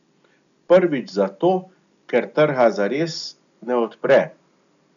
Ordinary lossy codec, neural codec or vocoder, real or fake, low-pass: none; none; real; 7.2 kHz